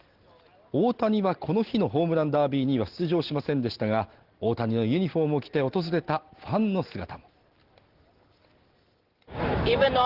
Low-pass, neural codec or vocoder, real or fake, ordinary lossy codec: 5.4 kHz; none; real; Opus, 16 kbps